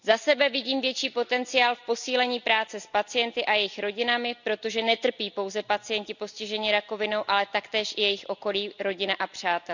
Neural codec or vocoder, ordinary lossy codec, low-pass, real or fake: none; none; 7.2 kHz; real